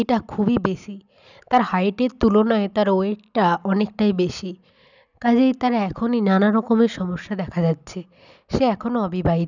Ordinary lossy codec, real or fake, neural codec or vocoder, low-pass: none; real; none; 7.2 kHz